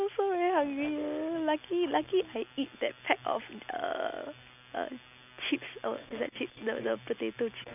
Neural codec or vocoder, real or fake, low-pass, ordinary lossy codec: none; real; 3.6 kHz; none